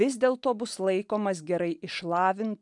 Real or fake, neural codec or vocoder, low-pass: real; none; 10.8 kHz